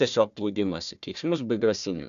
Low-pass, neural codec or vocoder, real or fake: 7.2 kHz; codec, 16 kHz, 1 kbps, FunCodec, trained on Chinese and English, 50 frames a second; fake